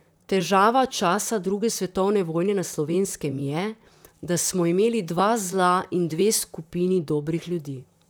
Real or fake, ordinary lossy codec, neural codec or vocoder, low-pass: fake; none; vocoder, 44.1 kHz, 128 mel bands, Pupu-Vocoder; none